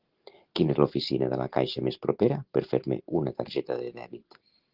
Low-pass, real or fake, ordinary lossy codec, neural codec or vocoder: 5.4 kHz; real; Opus, 16 kbps; none